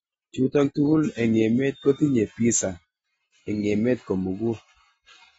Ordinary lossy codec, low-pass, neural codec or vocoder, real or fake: AAC, 24 kbps; 10.8 kHz; none; real